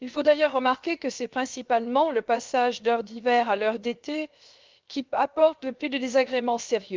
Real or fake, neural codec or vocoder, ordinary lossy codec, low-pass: fake; codec, 16 kHz, 0.7 kbps, FocalCodec; Opus, 24 kbps; 7.2 kHz